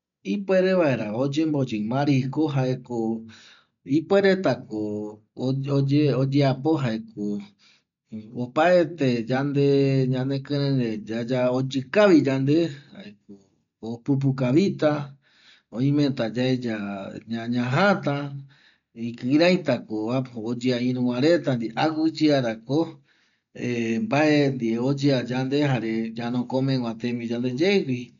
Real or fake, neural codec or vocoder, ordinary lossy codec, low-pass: real; none; none; 7.2 kHz